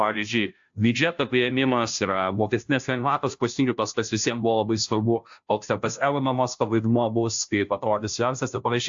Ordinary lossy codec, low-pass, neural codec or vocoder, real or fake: AAC, 64 kbps; 7.2 kHz; codec, 16 kHz, 0.5 kbps, FunCodec, trained on Chinese and English, 25 frames a second; fake